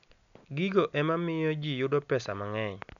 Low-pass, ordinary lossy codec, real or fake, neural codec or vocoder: 7.2 kHz; none; real; none